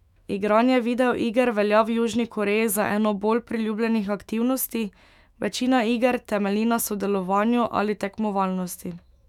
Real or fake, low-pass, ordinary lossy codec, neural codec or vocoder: fake; 19.8 kHz; none; autoencoder, 48 kHz, 128 numbers a frame, DAC-VAE, trained on Japanese speech